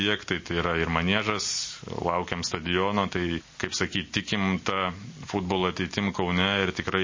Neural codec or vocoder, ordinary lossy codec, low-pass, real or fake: none; MP3, 32 kbps; 7.2 kHz; real